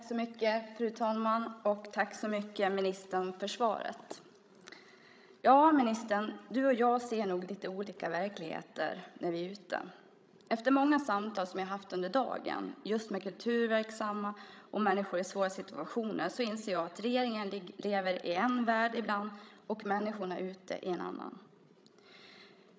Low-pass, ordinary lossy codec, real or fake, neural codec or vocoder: none; none; fake; codec, 16 kHz, 16 kbps, FreqCodec, larger model